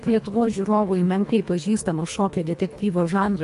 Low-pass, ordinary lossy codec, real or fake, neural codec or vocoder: 10.8 kHz; AAC, 64 kbps; fake; codec, 24 kHz, 1.5 kbps, HILCodec